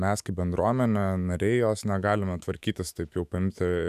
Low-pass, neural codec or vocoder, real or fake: 14.4 kHz; autoencoder, 48 kHz, 128 numbers a frame, DAC-VAE, trained on Japanese speech; fake